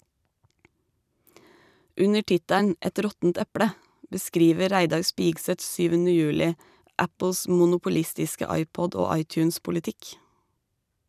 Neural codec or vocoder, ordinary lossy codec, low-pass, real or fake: vocoder, 44.1 kHz, 128 mel bands every 256 samples, BigVGAN v2; none; 14.4 kHz; fake